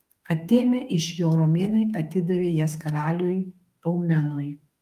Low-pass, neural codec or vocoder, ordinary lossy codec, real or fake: 14.4 kHz; autoencoder, 48 kHz, 32 numbers a frame, DAC-VAE, trained on Japanese speech; Opus, 32 kbps; fake